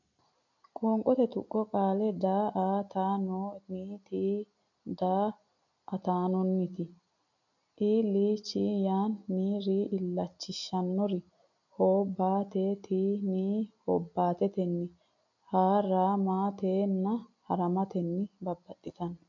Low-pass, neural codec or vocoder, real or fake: 7.2 kHz; none; real